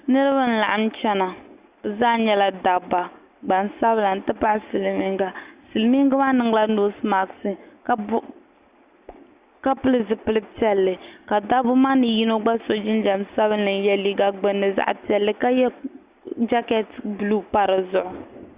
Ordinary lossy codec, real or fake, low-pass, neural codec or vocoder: Opus, 64 kbps; real; 3.6 kHz; none